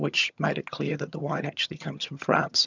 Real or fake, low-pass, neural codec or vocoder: fake; 7.2 kHz; vocoder, 22.05 kHz, 80 mel bands, HiFi-GAN